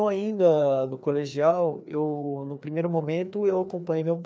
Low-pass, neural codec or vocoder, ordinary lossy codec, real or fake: none; codec, 16 kHz, 2 kbps, FreqCodec, larger model; none; fake